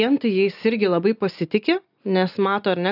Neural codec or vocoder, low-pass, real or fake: none; 5.4 kHz; real